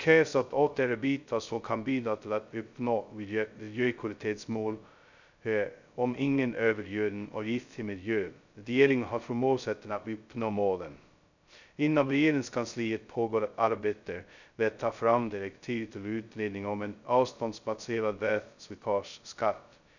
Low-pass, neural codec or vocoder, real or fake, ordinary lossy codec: 7.2 kHz; codec, 16 kHz, 0.2 kbps, FocalCodec; fake; none